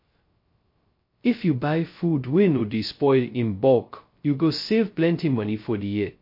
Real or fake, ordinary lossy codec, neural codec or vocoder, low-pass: fake; MP3, 32 kbps; codec, 16 kHz, 0.2 kbps, FocalCodec; 5.4 kHz